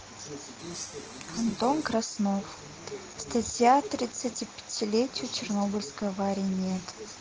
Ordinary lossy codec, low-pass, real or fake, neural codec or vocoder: Opus, 16 kbps; 7.2 kHz; real; none